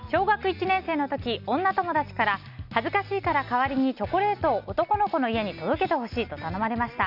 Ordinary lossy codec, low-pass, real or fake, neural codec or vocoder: none; 5.4 kHz; real; none